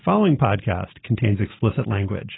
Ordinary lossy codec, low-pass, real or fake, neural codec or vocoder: AAC, 16 kbps; 7.2 kHz; real; none